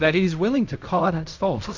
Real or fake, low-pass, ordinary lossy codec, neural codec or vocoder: fake; 7.2 kHz; MP3, 64 kbps; codec, 16 kHz in and 24 kHz out, 0.4 kbps, LongCat-Audio-Codec, fine tuned four codebook decoder